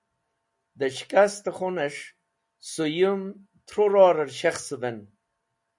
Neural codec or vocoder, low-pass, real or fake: none; 10.8 kHz; real